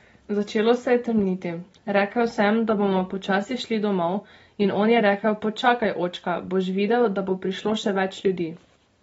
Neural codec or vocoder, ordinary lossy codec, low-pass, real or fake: none; AAC, 24 kbps; 9.9 kHz; real